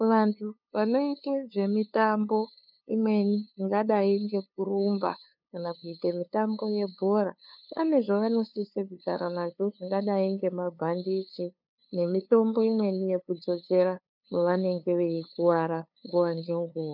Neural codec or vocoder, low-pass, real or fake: codec, 16 kHz, 2 kbps, FunCodec, trained on LibriTTS, 25 frames a second; 5.4 kHz; fake